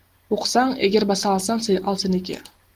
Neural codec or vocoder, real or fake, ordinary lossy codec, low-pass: none; real; Opus, 24 kbps; 14.4 kHz